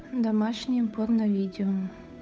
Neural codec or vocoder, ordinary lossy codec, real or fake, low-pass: codec, 16 kHz, 8 kbps, FunCodec, trained on Chinese and English, 25 frames a second; none; fake; none